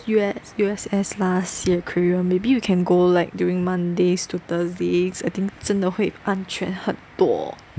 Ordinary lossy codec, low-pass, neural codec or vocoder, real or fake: none; none; none; real